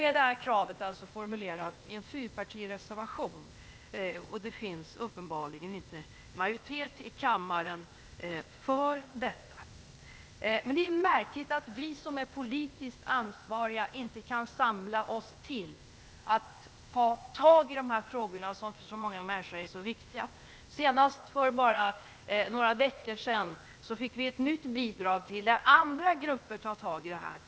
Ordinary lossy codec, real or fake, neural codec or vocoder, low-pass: none; fake; codec, 16 kHz, 0.8 kbps, ZipCodec; none